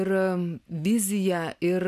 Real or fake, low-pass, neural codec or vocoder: real; 14.4 kHz; none